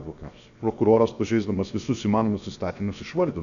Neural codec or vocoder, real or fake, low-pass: codec, 16 kHz, 0.7 kbps, FocalCodec; fake; 7.2 kHz